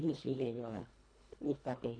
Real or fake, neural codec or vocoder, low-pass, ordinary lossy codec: fake; codec, 24 kHz, 1.5 kbps, HILCodec; 9.9 kHz; none